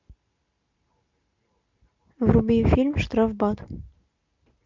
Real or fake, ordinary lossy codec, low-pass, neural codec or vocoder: real; MP3, 64 kbps; 7.2 kHz; none